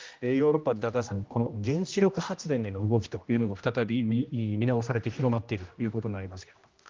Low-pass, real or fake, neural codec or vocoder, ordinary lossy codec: 7.2 kHz; fake; codec, 16 kHz, 1 kbps, X-Codec, HuBERT features, trained on general audio; Opus, 24 kbps